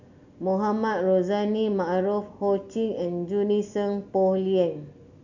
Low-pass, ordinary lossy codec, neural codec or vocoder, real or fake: 7.2 kHz; none; none; real